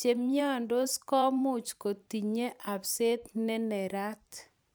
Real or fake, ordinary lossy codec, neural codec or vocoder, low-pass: fake; none; vocoder, 44.1 kHz, 128 mel bands every 512 samples, BigVGAN v2; none